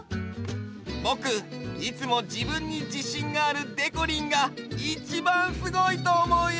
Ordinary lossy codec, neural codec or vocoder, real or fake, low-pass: none; none; real; none